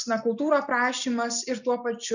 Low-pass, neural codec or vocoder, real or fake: 7.2 kHz; none; real